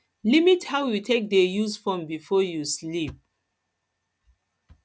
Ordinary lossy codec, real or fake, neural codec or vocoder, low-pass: none; real; none; none